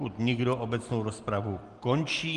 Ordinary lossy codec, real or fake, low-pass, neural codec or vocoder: Opus, 24 kbps; real; 10.8 kHz; none